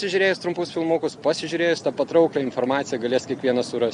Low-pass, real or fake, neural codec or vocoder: 10.8 kHz; real; none